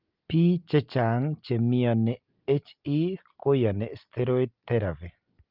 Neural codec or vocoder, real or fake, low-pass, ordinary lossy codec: none; real; 5.4 kHz; Opus, 24 kbps